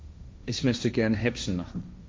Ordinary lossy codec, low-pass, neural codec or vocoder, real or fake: none; none; codec, 16 kHz, 1.1 kbps, Voila-Tokenizer; fake